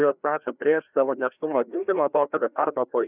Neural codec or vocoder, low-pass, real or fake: codec, 16 kHz, 1 kbps, FreqCodec, larger model; 3.6 kHz; fake